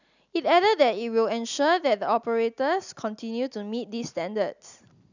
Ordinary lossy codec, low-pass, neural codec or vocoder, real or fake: none; 7.2 kHz; none; real